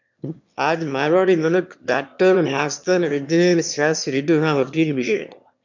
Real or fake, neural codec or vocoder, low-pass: fake; autoencoder, 22.05 kHz, a latent of 192 numbers a frame, VITS, trained on one speaker; 7.2 kHz